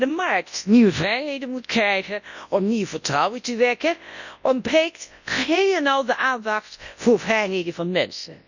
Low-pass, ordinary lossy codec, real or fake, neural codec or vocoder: 7.2 kHz; none; fake; codec, 24 kHz, 0.9 kbps, WavTokenizer, large speech release